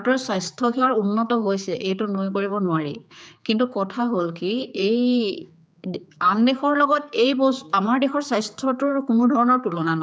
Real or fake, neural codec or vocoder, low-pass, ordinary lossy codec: fake; codec, 16 kHz, 4 kbps, X-Codec, HuBERT features, trained on general audio; none; none